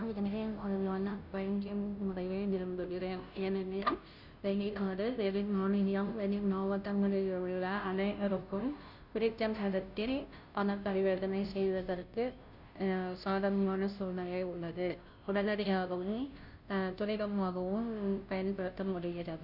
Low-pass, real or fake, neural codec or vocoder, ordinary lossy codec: 5.4 kHz; fake; codec, 16 kHz, 0.5 kbps, FunCodec, trained on Chinese and English, 25 frames a second; none